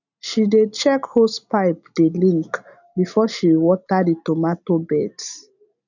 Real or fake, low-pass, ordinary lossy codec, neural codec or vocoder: real; 7.2 kHz; none; none